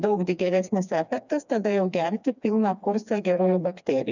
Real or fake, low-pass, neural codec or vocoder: fake; 7.2 kHz; codec, 16 kHz, 2 kbps, FreqCodec, smaller model